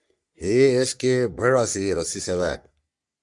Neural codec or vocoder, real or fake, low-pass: codec, 44.1 kHz, 3.4 kbps, Pupu-Codec; fake; 10.8 kHz